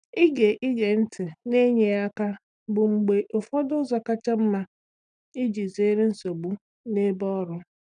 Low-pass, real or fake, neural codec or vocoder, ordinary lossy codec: 9.9 kHz; real; none; none